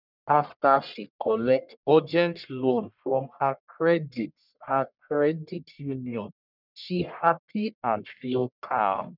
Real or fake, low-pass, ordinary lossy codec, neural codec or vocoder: fake; 5.4 kHz; none; codec, 44.1 kHz, 1.7 kbps, Pupu-Codec